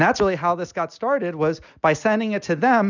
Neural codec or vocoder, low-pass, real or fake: none; 7.2 kHz; real